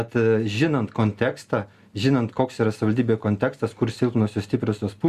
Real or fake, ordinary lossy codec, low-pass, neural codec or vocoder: real; Opus, 64 kbps; 14.4 kHz; none